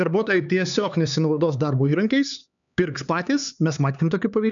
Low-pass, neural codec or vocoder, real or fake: 7.2 kHz; codec, 16 kHz, 4 kbps, X-Codec, HuBERT features, trained on LibriSpeech; fake